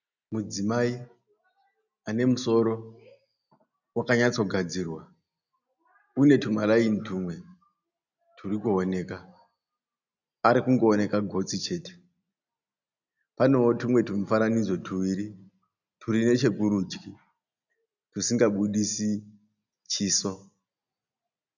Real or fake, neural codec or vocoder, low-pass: real; none; 7.2 kHz